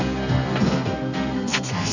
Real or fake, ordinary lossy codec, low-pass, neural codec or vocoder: fake; none; 7.2 kHz; codec, 32 kHz, 1.9 kbps, SNAC